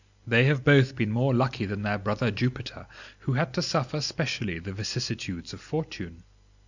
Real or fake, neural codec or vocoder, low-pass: real; none; 7.2 kHz